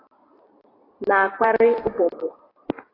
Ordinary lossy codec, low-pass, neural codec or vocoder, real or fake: AAC, 24 kbps; 5.4 kHz; vocoder, 44.1 kHz, 128 mel bands every 256 samples, BigVGAN v2; fake